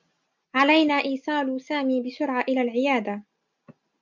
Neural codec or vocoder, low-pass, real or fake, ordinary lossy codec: none; 7.2 kHz; real; MP3, 64 kbps